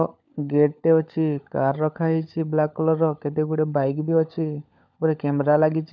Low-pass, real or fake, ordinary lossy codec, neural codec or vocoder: 7.2 kHz; fake; MP3, 64 kbps; codec, 16 kHz, 16 kbps, FunCodec, trained on LibriTTS, 50 frames a second